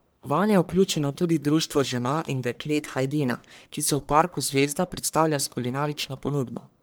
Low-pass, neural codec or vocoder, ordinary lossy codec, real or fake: none; codec, 44.1 kHz, 1.7 kbps, Pupu-Codec; none; fake